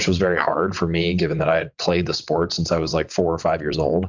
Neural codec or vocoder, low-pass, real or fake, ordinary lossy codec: vocoder, 22.05 kHz, 80 mel bands, WaveNeXt; 7.2 kHz; fake; MP3, 64 kbps